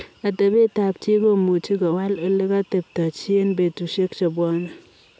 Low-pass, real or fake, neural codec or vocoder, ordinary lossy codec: none; real; none; none